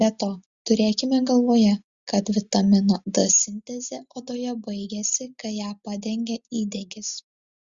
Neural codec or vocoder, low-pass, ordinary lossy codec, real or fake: none; 7.2 kHz; Opus, 64 kbps; real